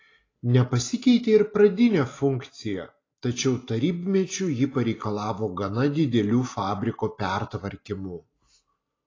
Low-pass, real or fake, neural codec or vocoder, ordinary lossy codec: 7.2 kHz; real; none; AAC, 32 kbps